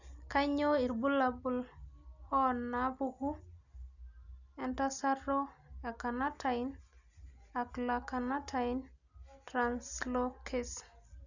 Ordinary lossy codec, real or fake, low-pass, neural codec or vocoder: none; real; 7.2 kHz; none